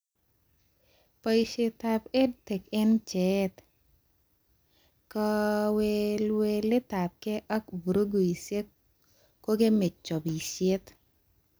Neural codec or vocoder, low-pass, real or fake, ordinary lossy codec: none; none; real; none